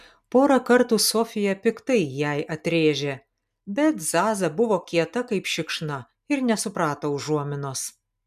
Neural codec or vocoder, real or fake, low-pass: none; real; 14.4 kHz